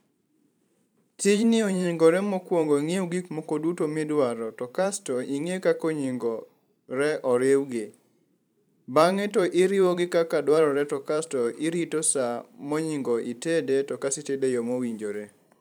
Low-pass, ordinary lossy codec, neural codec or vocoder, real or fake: none; none; vocoder, 44.1 kHz, 128 mel bands every 512 samples, BigVGAN v2; fake